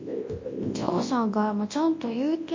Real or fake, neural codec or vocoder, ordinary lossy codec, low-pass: fake; codec, 24 kHz, 0.9 kbps, WavTokenizer, large speech release; MP3, 32 kbps; 7.2 kHz